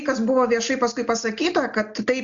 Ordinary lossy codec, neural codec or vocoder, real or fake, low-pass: AAC, 64 kbps; none; real; 7.2 kHz